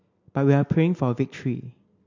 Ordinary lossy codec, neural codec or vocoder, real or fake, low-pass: MP3, 48 kbps; none; real; 7.2 kHz